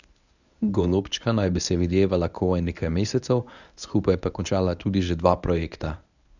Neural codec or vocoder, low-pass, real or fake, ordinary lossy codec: codec, 24 kHz, 0.9 kbps, WavTokenizer, medium speech release version 1; 7.2 kHz; fake; none